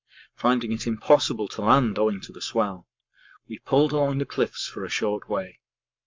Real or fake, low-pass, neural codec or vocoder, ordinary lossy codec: fake; 7.2 kHz; codec, 44.1 kHz, 7.8 kbps, Pupu-Codec; MP3, 64 kbps